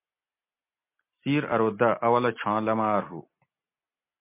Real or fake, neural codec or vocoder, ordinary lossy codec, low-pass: real; none; MP3, 24 kbps; 3.6 kHz